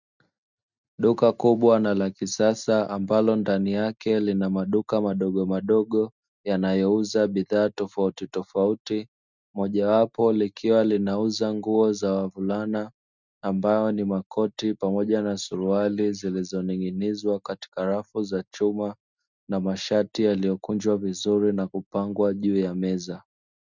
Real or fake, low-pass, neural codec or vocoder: real; 7.2 kHz; none